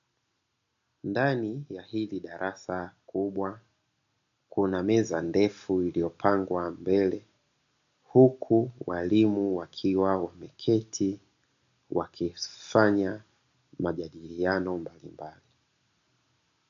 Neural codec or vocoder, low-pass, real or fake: none; 7.2 kHz; real